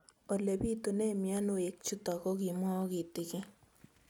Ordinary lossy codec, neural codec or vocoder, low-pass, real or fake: none; none; none; real